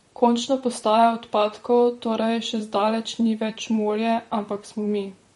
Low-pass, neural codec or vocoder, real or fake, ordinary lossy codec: 19.8 kHz; vocoder, 44.1 kHz, 128 mel bands, Pupu-Vocoder; fake; MP3, 48 kbps